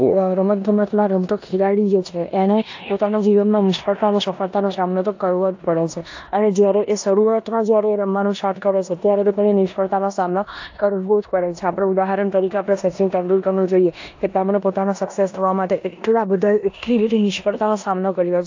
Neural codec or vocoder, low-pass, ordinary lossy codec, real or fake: codec, 16 kHz in and 24 kHz out, 0.9 kbps, LongCat-Audio-Codec, four codebook decoder; 7.2 kHz; AAC, 48 kbps; fake